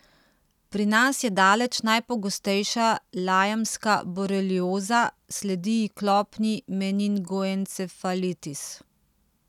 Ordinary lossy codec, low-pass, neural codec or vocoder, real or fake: none; 19.8 kHz; none; real